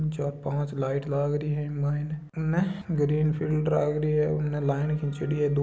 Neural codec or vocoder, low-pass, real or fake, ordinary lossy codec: none; none; real; none